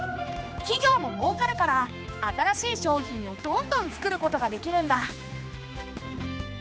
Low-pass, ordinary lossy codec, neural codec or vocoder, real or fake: none; none; codec, 16 kHz, 2 kbps, X-Codec, HuBERT features, trained on general audio; fake